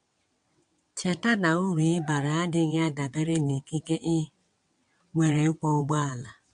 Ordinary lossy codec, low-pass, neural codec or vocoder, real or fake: MP3, 64 kbps; 9.9 kHz; vocoder, 22.05 kHz, 80 mel bands, WaveNeXt; fake